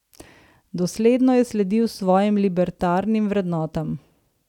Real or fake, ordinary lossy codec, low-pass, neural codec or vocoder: real; none; 19.8 kHz; none